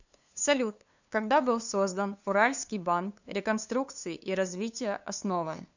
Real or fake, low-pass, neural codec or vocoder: fake; 7.2 kHz; codec, 16 kHz, 2 kbps, FunCodec, trained on LibriTTS, 25 frames a second